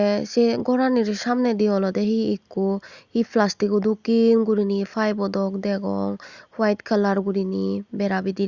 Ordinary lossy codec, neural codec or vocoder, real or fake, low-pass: Opus, 64 kbps; none; real; 7.2 kHz